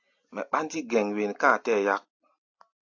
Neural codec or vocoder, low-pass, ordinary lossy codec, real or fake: none; 7.2 kHz; AAC, 48 kbps; real